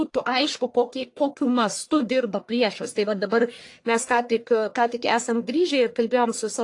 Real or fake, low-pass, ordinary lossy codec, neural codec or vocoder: fake; 10.8 kHz; AAC, 48 kbps; codec, 44.1 kHz, 1.7 kbps, Pupu-Codec